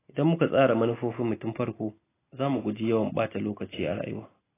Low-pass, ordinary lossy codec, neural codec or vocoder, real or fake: 3.6 kHz; AAC, 16 kbps; none; real